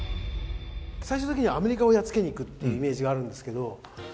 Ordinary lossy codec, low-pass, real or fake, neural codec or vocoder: none; none; real; none